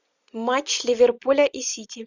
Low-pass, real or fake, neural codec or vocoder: 7.2 kHz; real; none